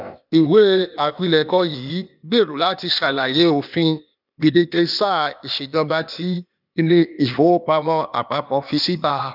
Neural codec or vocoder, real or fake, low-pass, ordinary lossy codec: codec, 16 kHz, 0.8 kbps, ZipCodec; fake; 5.4 kHz; none